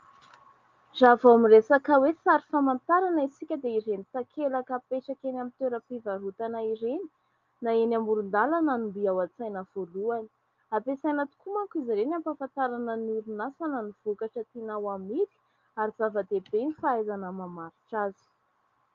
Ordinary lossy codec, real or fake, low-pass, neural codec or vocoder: Opus, 24 kbps; real; 7.2 kHz; none